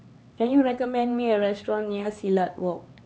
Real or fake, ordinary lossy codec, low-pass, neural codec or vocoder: fake; none; none; codec, 16 kHz, 4 kbps, X-Codec, HuBERT features, trained on LibriSpeech